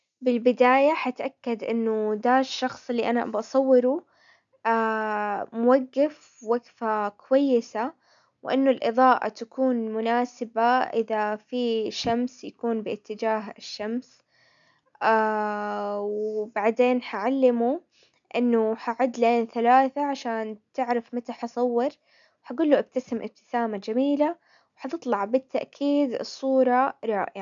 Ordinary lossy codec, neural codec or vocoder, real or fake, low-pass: none; none; real; 7.2 kHz